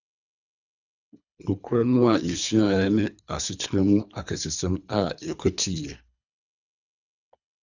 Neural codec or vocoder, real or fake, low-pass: codec, 24 kHz, 3 kbps, HILCodec; fake; 7.2 kHz